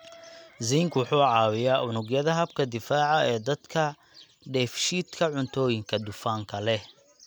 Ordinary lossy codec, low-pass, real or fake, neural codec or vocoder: none; none; real; none